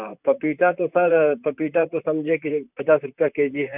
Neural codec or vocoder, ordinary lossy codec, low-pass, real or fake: vocoder, 44.1 kHz, 128 mel bands, Pupu-Vocoder; none; 3.6 kHz; fake